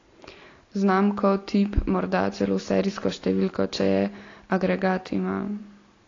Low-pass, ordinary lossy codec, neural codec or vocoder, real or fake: 7.2 kHz; AAC, 32 kbps; none; real